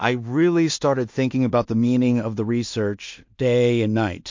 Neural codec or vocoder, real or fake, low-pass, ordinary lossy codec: codec, 16 kHz in and 24 kHz out, 0.4 kbps, LongCat-Audio-Codec, two codebook decoder; fake; 7.2 kHz; MP3, 48 kbps